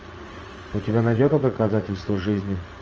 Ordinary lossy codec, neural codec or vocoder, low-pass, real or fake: Opus, 24 kbps; vocoder, 44.1 kHz, 80 mel bands, Vocos; 7.2 kHz; fake